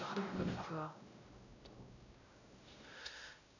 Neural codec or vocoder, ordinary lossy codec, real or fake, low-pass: codec, 16 kHz, 0.5 kbps, X-Codec, WavLM features, trained on Multilingual LibriSpeech; none; fake; 7.2 kHz